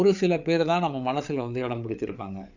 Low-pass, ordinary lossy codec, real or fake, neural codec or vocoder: 7.2 kHz; none; fake; codec, 16 kHz, 8 kbps, FreqCodec, larger model